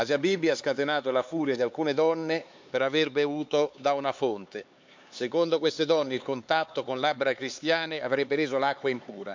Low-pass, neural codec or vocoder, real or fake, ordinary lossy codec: 7.2 kHz; codec, 16 kHz, 4 kbps, X-Codec, HuBERT features, trained on LibriSpeech; fake; MP3, 64 kbps